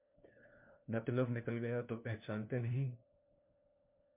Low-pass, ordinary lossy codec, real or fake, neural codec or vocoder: 3.6 kHz; MP3, 32 kbps; fake; codec, 16 kHz, 1 kbps, FunCodec, trained on LibriTTS, 50 frames a second